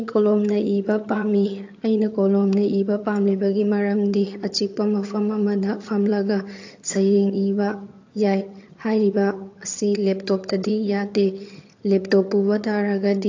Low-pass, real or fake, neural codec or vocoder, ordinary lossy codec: 7.2 kHz; fake; vocoder, 22.05 kHz, 80 mel bands, HiFi-GAN; AAC, 48 kbps